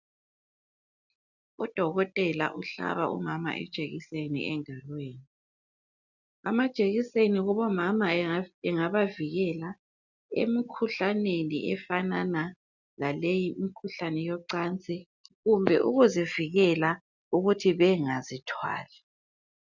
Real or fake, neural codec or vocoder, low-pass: real; none; 7.2 kHz